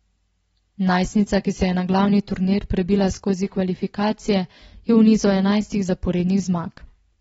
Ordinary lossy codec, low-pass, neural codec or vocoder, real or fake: AAC, 24 kbps; 19.8 kHz; none; real